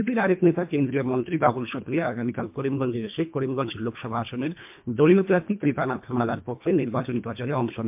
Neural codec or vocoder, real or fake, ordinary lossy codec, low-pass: codec, 24 kHz, 1.5 kbps, HILCodec; fake; MP3, 32 kbps; 3.6 kHz